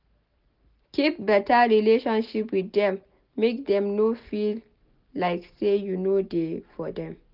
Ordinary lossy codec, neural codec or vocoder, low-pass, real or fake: Opus, 32 kbps; none; 5.4 kHz; real